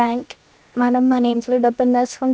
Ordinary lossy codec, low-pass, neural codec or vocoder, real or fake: none; none; codec, 16 kHz, 0.7 kbps, FocalCodec; fake